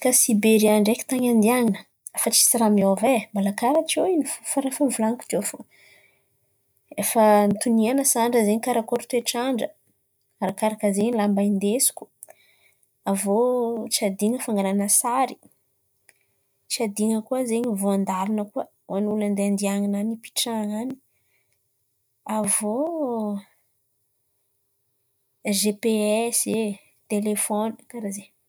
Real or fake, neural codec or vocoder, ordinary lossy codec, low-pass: real; none; none; none